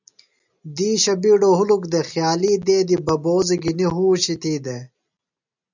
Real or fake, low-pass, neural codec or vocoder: real; 7.2 kHz; none